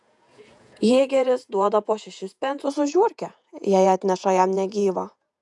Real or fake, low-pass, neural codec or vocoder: fake; 10.8 kHz; vocoder, 48 kHz, 128 mel bands, Vocos